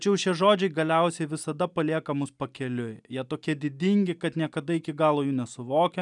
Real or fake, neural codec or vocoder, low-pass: real; none; 10.8 kHz